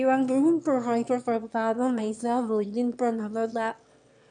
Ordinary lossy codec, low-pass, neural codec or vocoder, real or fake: none; 9.9 kHz; autoencoder, 22.05 kHz, a latent of 192 numbers a frame, VITS, trained on one speaker; fake